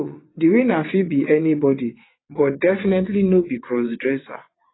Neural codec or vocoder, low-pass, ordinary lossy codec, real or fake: vocoder, 22.05 kHz, 80 mel bands, WaveNeXt; 7.2 kHz; AAC, 16 kbps; fake